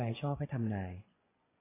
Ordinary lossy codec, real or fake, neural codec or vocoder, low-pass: AAC, 16 kbps; fake; vocoder, 24 kHz, 100 mel bands, Vocos; 3.6 kHz